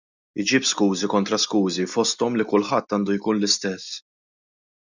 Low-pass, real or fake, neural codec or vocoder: 7.2 kHz; real; none